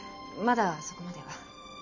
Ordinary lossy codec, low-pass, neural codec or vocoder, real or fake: none; 7.2 kHz; none; real